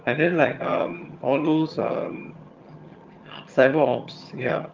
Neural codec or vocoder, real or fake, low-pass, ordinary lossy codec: vocoder, 22.05 kHz, 80 mel bands, HiFi-GAN; fake; 7.2 kHz; Opus, 32 kbps